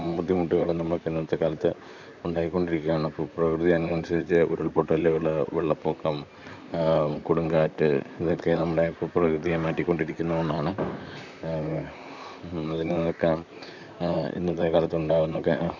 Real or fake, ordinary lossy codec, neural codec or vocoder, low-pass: fake; none; vocoder, 44.1 kHz, 128 mel bands, Pupu-Vocoder; 7.2 kHz